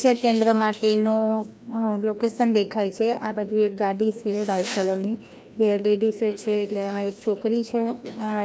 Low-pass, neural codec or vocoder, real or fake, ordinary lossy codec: none; codec, 16 kHz, 1 kbps, FreqCodec, larger model; fake; none